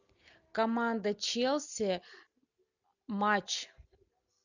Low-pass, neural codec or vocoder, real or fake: 7.2 kHz; none; real